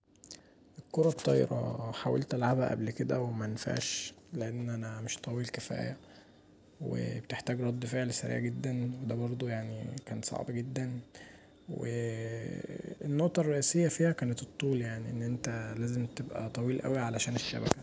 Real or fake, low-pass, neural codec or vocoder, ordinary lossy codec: real; none; none; none